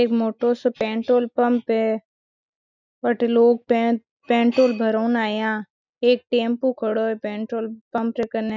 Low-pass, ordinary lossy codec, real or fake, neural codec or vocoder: 7.2 kHz; none; real; none